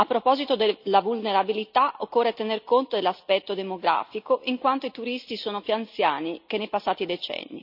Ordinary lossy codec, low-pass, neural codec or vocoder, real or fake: none; 5.4 kHz; none; real